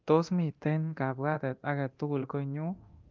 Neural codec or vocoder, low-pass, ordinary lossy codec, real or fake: codec, 24 kHz, 0.9 kbps, DualCodec; 7.2 kHz; Opus, 32 kbps; fake